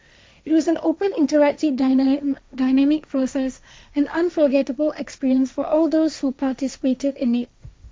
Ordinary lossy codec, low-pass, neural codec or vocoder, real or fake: none; none; codec, 16 kHz, 1.1 kbps, Voila-Tokenizer; fake